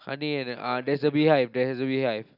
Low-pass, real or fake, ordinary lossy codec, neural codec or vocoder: 5.4 kHz; real; none; none